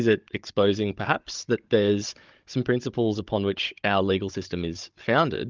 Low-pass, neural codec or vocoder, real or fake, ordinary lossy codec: 7.2 kHz; codec, 16 kHz, 16 kbps, FunCodec, trained on Chinese and English, 50 frames a second; fake; Opus, 24 kbps